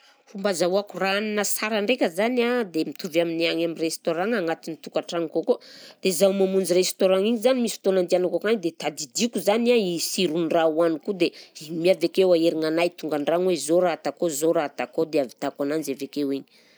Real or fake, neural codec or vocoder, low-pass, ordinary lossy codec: real; none; none; none